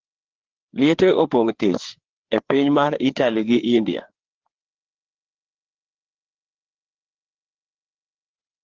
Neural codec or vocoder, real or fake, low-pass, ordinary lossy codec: codec, 16 kHz, 4 kbps, FreqCodec, larger model; fake; 7.2 kHz; Opus, 16 kbps